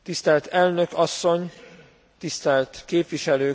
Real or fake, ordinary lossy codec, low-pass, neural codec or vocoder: real; none; none; none